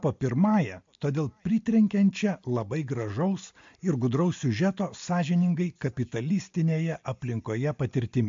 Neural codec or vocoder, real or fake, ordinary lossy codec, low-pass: none; real; MP3, 48 kbps; 7.2 kHz